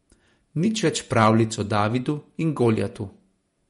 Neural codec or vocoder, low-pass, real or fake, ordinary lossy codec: vocoder, 48 kHz, 128 mel bands, Vocos; 19.8 kHz; fake; MP3, 48 kbps